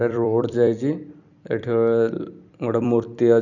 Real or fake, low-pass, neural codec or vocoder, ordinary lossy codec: fake; 7.2 kHz; vocoder, 44.1 kHz, 128 mel bands every 256 samples, BigVGAN v2; none